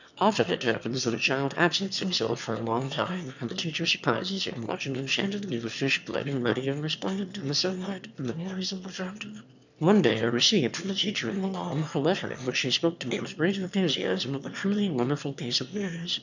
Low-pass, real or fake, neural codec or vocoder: 7.2 kHz; fake; autoencoder, 22.05 kHz, a latent of 192 numbers a frame, VITS, trained on one speaker